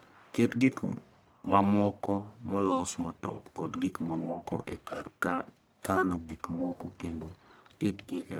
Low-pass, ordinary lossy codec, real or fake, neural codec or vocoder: none; none; fake; codec, 44.1 kHz, 1.7 kbps, Pupu-Codec